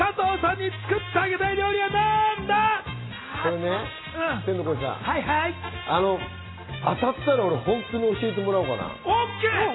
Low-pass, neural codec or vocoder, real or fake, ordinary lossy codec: 7.2 kHz; none; real; AAC, 16 kbps